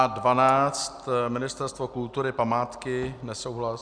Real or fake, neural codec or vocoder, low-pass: real; none; 9.9 kHz